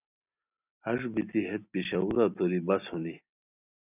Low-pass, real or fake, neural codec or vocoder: 3.6 kHz; real; none